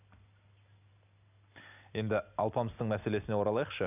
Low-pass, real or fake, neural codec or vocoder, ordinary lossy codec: 3.6 kHz; real; none; none